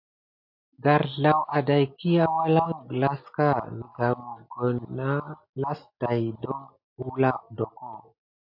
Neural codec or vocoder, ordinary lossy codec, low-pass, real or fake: none; AAC, 48 kbps; 5.4 kHz; real